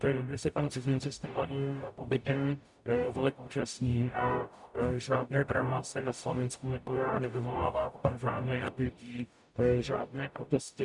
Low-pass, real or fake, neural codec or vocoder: 10.8 kHz; fake; codec, 44.1 kHz, 0.9 kbps, DAC